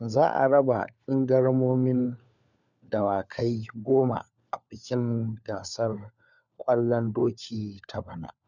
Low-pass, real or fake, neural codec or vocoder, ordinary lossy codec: 7.2 kHz; fake; codec, 16 kHz, 4 kbps, FunCodec, trained on LibriTTS, 50 frames a second; none